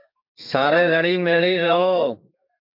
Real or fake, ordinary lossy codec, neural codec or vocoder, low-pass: fake; MP3, 48 kbps; codec, 32 kHz, 1.9 kbps, SNAC; 5.4 kHz